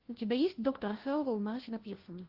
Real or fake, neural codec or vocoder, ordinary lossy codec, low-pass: fake; codec, 16 kHz, 1 kbps, FunCodec, trained on LibriTTS, 50 frames a second; Opus, 16 kbps; 5.4 kHz